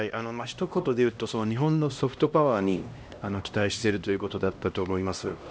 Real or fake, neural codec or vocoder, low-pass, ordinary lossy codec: fake; codec, 16 kHz, 1 kbps, X-Codec, HuBERT features, trained on LibriSpeech; none; none